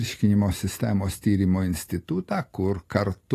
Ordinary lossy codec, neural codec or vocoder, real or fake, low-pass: AAC, 48 kbps; none; real; 14.4 kHz